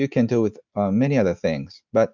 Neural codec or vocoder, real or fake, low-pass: none; real; 7.2 kHz